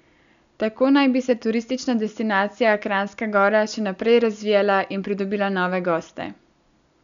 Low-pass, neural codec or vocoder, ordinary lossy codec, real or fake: 7.2 kHz; none; none; real